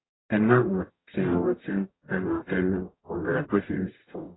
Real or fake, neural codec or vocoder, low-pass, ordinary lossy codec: fake; codec, 44.1 kHz, 0.9 kbps, DAC; 7.2 kHz; AAC, 16 kbps